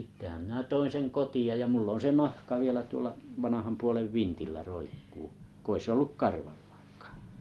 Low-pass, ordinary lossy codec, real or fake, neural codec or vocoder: 19.8 kHz; Opus, 24 kbps; real; none